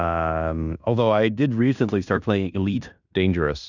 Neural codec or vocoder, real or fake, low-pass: codec, 16 kHz in and 24 kHz out, 0.9 kbps, LongCat-Audio-Codec, fine tuned four codebook decoder; fake; 7.2 kHz